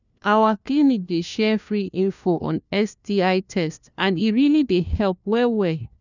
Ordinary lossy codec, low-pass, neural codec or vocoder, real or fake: none; 7.2 kHz; codec, 16 kHz, 1 kbps, FunCodec, trained on LibriTTS, 50 frames a second; fake